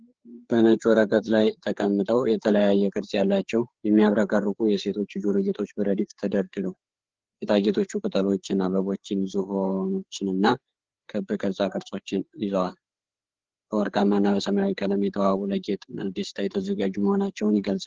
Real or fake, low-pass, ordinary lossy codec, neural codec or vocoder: fake; 7.2 kHz; Opus, 16 kbps; codec, 16 kHz, 4 kbps, FreqCodec, larger model